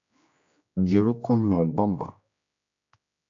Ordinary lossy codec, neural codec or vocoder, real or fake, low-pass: AAC, 48 kbps; codec, 16 kHz, 1 kbps, X-Codec, HuBERT features, trained on general audio; fake; 7.2 kHz